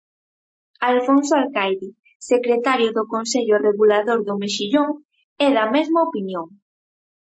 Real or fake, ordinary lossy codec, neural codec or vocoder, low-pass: real; MP3, 32 kbps; none; 9.9 kHz